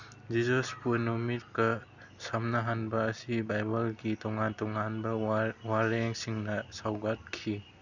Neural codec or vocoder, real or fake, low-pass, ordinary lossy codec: none; real; 7.2 kHz; none